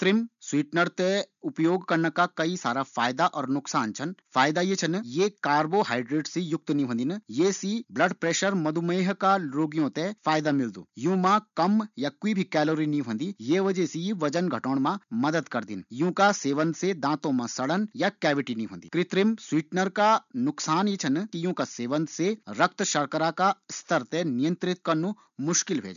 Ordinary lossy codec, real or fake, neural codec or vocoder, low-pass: none; real; none; 7.2 kHz